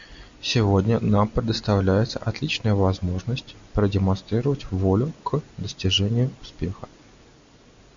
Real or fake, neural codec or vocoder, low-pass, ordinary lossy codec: real; none; 7.2 kHz; MP3, 64 kbps